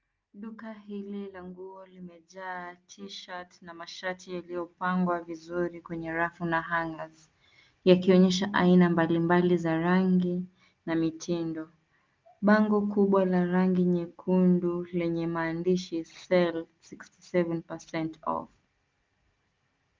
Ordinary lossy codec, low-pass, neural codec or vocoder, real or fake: Opus, 24 kbps; 7.2 kHz; none; real